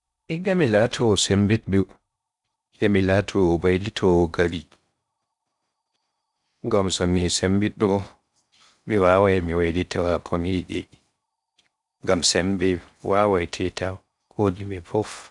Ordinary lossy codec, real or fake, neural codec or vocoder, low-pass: none; fake; codec, 16 kHz in and 24 kHz out, 0.6 kbps, FocalCodec, streaming, 4096 codes; 10.8 kHz